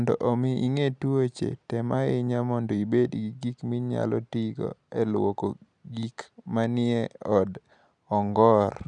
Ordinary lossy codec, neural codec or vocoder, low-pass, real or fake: none; none; 9.9 kHz; real